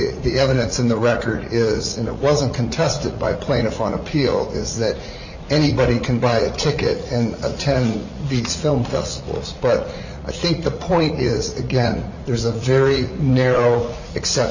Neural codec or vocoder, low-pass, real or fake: vocoder, 44.1 kHz, 80 mel bands, Vocos; 7.2 kHz; fake